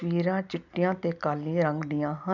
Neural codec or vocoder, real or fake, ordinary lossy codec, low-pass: none; real; none; 7.2 kHz